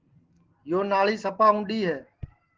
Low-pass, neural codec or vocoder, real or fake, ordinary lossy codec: 7.2 kHz; none; real; Opus, 16 kbps